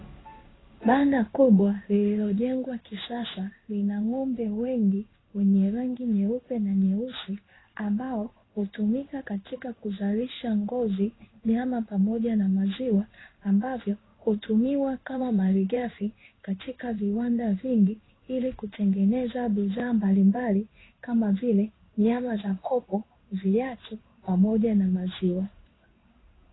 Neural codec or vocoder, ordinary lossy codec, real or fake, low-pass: codec, 16 kHz in and 24 kHz out, 1 kbps, XY-Tokenizer; AAC, 16 kbps; fake; 7.2 kHz